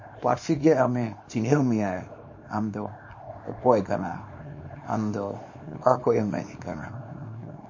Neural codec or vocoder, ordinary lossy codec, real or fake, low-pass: codec, 24 kHz, 0.9 kbps, WavTokenizer, small release; MP3, 32 kbps; fake; 7.2 kHz